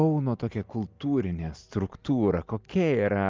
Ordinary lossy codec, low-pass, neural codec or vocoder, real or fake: Opus, 24 kbps; 7.2 kHz; none; real